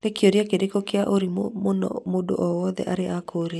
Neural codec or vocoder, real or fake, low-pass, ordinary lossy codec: none; real; none; none